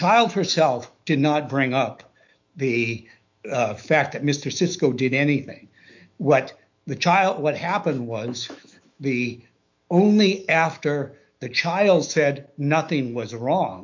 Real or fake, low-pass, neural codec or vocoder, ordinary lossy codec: fake; 7.2 kHz; autoencoder, 48 kHz, 128 numbers a frame, DAC-VAE, trained on Japanese speech; MP3, 64 kbps